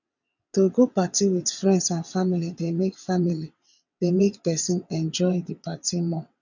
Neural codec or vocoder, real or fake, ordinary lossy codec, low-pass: vocoder, 22.05 kHz, 80 mel bands, WaveNeXt; fake; none; 7.2 kHz